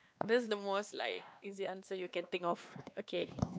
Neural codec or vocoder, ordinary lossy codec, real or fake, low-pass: codec, 16 kHz, 2 kbps, X-Codec, WavLM features, trained on Multilingual LibriSpeech; none; fake; none